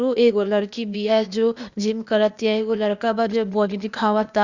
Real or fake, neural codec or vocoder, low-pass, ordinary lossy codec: fake; codec, 16 kHz, 0.8 kbps, ZipCodec; 7.2 kHz; Opus, 64 kbps